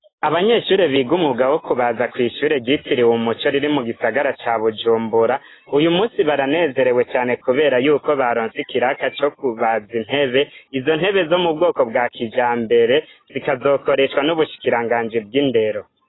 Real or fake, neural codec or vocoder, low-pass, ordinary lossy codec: real; none; 7.2 kHz; AAC, 16 kbps